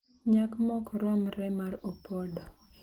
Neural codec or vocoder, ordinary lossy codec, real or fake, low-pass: none; Opus, 16 kbps; real; 19.8 kHz